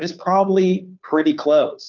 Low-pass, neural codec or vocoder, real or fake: 7.2 kHz; codec, 16 kHz, 2 kbps, FunCodec, trained on Chinese and English, 25 frames a second; fake